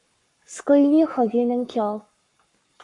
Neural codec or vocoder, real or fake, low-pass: codec, 44.1 kHz, 7.8 kbps, Pupu-Codec; fake; 10.8 kHz